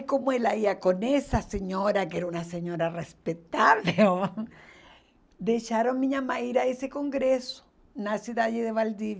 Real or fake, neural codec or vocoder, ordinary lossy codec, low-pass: real; none; none; none